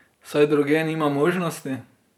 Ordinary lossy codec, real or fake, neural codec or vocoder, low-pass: none; fake; vocoder, 44.1 kHz, 128 mel bands every 512 samples, BigVGAN v2; 19.8 kHz